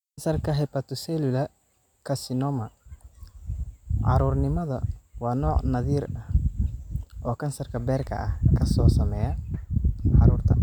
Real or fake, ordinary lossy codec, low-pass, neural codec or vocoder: real; none; 19.8 kHz; none